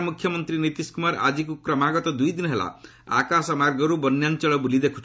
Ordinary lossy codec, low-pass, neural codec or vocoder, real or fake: none; none; none; real